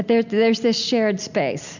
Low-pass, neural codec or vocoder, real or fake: 7.2 kHz; none; real